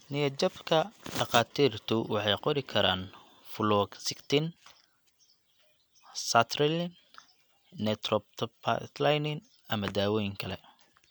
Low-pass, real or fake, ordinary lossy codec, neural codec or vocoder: none; real; none; none